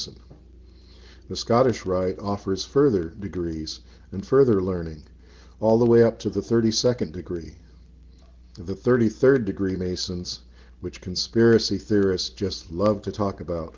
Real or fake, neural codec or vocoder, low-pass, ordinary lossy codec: real; none; 7.2 kHz; Opus, 16 kbps